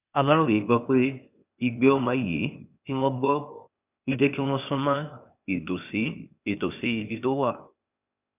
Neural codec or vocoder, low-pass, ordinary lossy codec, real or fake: codec, 16 kHz, 0.8 kbps, ZipCodec; 3.6 kHz; none; fake